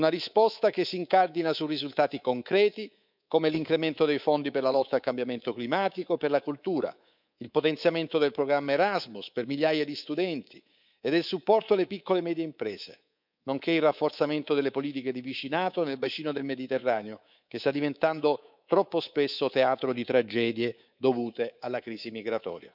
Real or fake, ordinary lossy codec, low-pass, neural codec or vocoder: fake; none; 5.4 kHz; codec, 24 kHz, 3.1 kbps, DualCodec